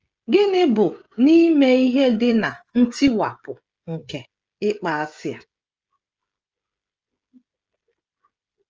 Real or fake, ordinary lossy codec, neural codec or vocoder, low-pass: fake; Opus, 24 kbps; codec, 16 kHz, 16 kbps, FreqCodec, smaller model; 7.2 kHz